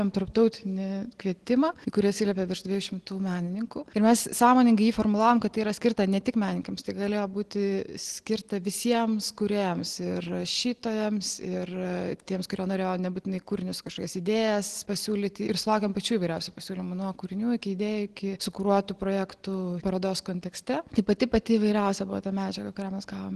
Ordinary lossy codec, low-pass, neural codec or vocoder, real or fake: Opus, 16 kbps; 10.8 kHz; none; real